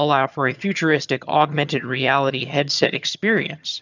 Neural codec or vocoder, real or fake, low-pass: vocoder, 22.05 kHz, 80 mel bands, HiFi-GAN; fake; 7.2 kHz